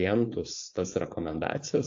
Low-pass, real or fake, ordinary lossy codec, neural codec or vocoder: 7.2 kHz; fake; AAC, 48 kbps; codec, 16 kHz, 4.8 kbps, FACodec